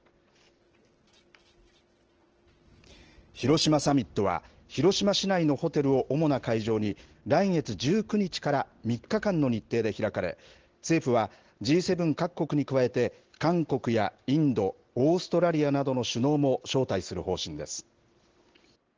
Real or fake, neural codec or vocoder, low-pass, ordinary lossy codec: real; none; 7.2 kHz; Opus, 16 kbps